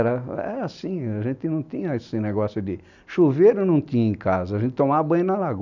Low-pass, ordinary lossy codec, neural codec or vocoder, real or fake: 7.2 kHz; none; none; real